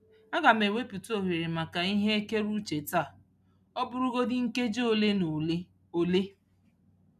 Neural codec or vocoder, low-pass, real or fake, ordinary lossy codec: none; 14.4 kHz; real; none